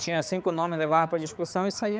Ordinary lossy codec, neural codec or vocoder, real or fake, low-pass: none; codec, 16 kHz, 4 kbps, X-Codec, HuBERT features, trained on balanced general audio; fake; none